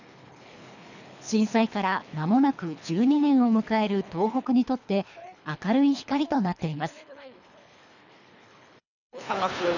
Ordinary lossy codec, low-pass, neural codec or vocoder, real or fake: none; 7.2 kHz; codec, 24 kHz, 3 kbps, HILCodec; fake